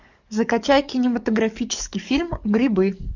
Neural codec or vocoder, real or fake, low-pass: codec, 44.1 kHz, 7.8 kbps, DAC; fake; 7.2 kHz